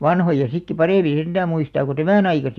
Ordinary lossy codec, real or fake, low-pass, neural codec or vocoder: none; real; 14.4 kHz; none